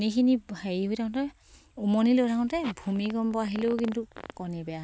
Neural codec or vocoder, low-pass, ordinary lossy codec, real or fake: none; none; none; real